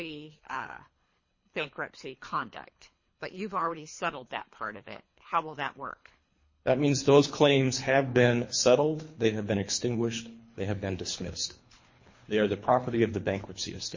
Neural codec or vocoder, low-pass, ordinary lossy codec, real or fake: codec, 24 kHz, 3 kbps, HILCodec; 7.2 kHz; MP3, 32 kbps; fake